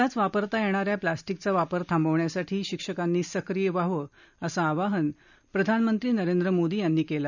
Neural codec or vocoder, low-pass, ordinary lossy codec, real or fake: none; 7.2 kHz; none; real